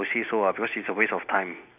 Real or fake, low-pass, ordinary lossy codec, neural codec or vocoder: real; 3.6 kHz; none; none